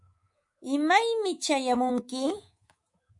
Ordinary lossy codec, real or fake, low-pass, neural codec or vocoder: MP3, 48 kbps; fake; 10.8 kHz; codec, 24 kHz, 3.1 kbps, DualCodec